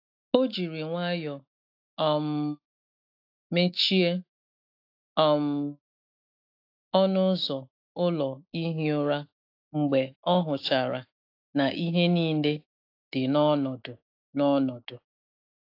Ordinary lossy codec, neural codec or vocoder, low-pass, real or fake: AAC, 32 kbps; autoencoder, 48 kHz, 128 numbers a frame, DAC-VAE, trained on Japanese speech; 5.4 kHz; fake